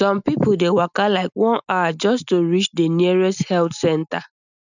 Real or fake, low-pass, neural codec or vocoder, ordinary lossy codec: real; 7.2 kHz; none; none